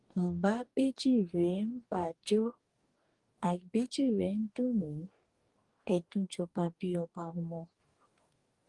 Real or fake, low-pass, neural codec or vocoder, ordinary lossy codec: fake; 10.8 kHz; codec, 44.1 kHz, 2.6 kbps, DAC; Opus, 24 kbps